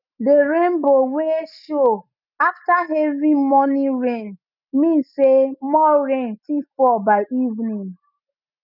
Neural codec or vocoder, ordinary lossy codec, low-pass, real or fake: none; none; 5.4 kHz; real